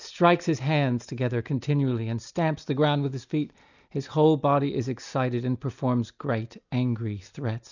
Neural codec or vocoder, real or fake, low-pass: none; real; 7.2 kHz